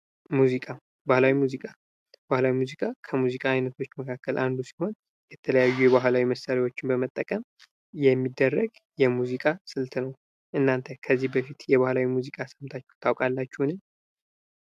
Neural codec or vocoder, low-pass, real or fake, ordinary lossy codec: none; 14.4 kHz; real; MP3, 96 kbps